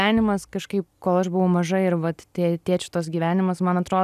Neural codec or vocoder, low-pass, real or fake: none; 14.4 kHz; real